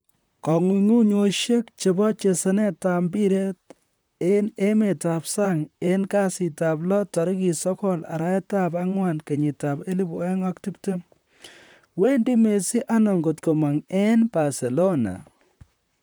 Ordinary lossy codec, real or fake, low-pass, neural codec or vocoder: none; fake; none; vocoder, 44.1 kHz, 128 mel bands, Pupu-Vocoder